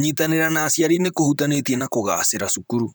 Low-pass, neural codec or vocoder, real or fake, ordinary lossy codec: none; vocoder, 44.1 kHz, 128 mel bands, Pupu-Vocoder; fake; none